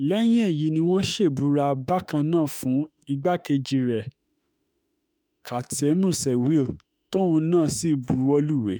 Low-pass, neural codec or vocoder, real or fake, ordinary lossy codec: none; autoencoder, 48 kHz, 32 numbers a frame, DAC-VAE, trained on Japanese speech; fake; none